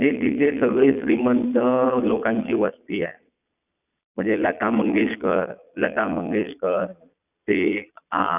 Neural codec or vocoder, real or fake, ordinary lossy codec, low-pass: vocoder, 22.05 kHz, 80 mel bands, Vocos; fake; none; 3.6 kHz